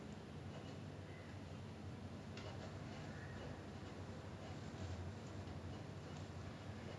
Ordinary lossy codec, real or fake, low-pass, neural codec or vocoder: none; real; none; none